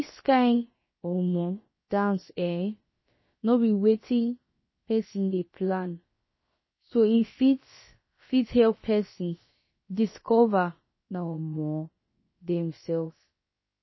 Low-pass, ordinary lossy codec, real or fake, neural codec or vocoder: 7.2 kHz; MP3, 24 kbps; fake; codec, 16 kHz, about 1 kbps, DyCAST, with the encoder's durations